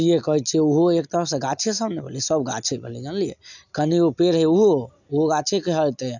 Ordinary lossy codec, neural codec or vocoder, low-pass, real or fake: none; none; 7.2 kHz; real